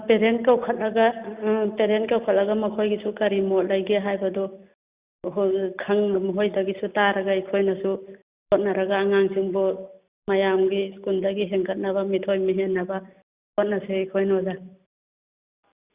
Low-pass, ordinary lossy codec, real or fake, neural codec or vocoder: 3.6 kHz; Opus, 64 kbps; real; none